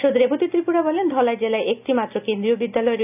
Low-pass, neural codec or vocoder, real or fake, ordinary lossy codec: 3.6 kHz; none; real; none